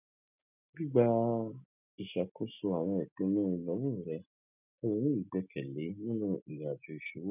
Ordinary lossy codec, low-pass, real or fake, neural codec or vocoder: none; 3.6 kHz; real; none